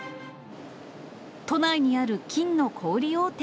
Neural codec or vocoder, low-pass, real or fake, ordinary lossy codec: none; none; real; none